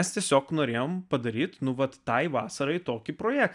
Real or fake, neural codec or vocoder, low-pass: real; none; 10.8 kHz